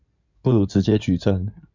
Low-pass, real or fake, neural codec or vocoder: 7.2 kHz; fake; codec, 16 kHz in and 24 kHz out, 2.2 kbps, FireRedTTS-2 codec